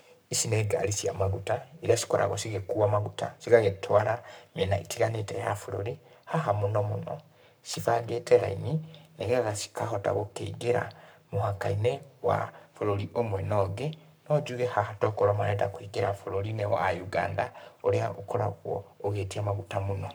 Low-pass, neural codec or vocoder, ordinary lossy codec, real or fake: none; codec, 44.1 kHz, 7.8 kbps, Pupu-Codec; none; fake